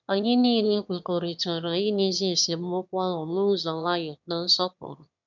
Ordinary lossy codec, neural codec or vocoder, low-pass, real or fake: none; autoencoder, 22.05 kHz, a latent of 192 numbers a frame, VITS, trained on one speaker; 7.2 kHz; fake